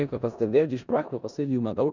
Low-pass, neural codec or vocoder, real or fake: 7.2 kHz; codec, 16 kHz in and 24 kHz out, 0.4 kbps, LongCat-Audio-Codec, four codebook decoder; fake